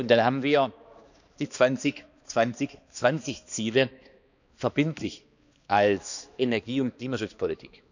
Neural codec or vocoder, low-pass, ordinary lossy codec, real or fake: codec, 16 kHz, 2 kbps, X-Codec, HuBERT features, trained on balanced general audio; 7.2 kHz; none; fake